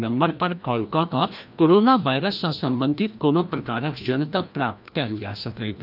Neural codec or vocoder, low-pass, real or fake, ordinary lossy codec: codec, 16 kHz, 1 kbps, FreqCodec, larger model; 5.4 kHz; fake; none